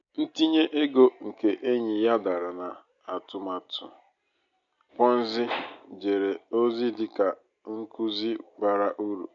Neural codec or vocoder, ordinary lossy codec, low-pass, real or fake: none; MP3, 64 kbps; 7.2 kHz; real